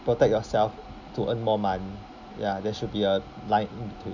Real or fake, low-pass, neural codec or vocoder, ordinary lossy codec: real; 7.2 kHz; none; none